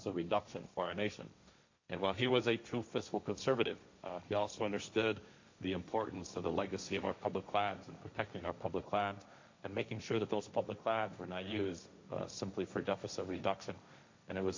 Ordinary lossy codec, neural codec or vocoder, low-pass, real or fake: MP3, 64 kbps; codec, 16 kHz, 1.1 kbps, Voila-Tokenizer; 7.2 kHz; fake